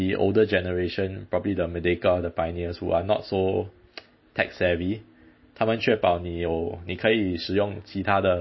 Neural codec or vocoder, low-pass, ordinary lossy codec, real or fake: none; 7.2 kHz; MP3, 24 kbps; real